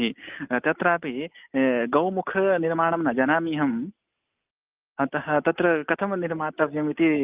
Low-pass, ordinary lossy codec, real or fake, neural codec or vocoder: 3.6 kHz; Opus, 24 kbps; real; none